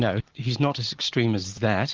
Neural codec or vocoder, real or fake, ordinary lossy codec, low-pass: none; real; Opus, 16 kbps; 7.2 kHz